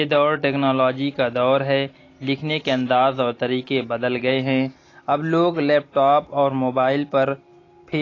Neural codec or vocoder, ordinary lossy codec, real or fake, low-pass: none; AAC, 32 kbps; real; 7.2 kHz